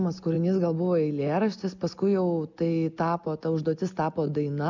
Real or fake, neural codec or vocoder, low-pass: real; none; 7.2 kHz